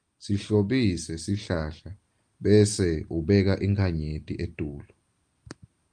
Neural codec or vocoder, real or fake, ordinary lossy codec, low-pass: none; real; Opus, 32 kbps; 9.9 kHz